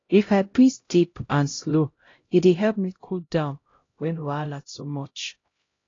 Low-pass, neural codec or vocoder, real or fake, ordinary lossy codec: 7.2 kHz; codec, 16 kHz, 0.5 kbps, X-Codec, HuBERT features, trained on LibriSpeech; fake; AAC, 32 kbps